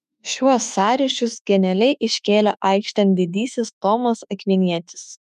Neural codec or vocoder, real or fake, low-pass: autoencoder, 48 kHz, 32 numbers a frame, DAC-VAE, trained on Japanese speech; fake; 14.4 kHz